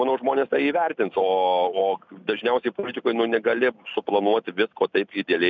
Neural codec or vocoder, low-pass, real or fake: none; 7.2 kHz; real